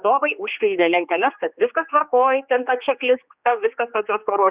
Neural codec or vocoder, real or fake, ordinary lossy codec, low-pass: codec, 16 kHz, 2 kbps, X-Codec, HuBERT features, trained on general audio; fake; Opus, 64 kbps; 3.6 kHz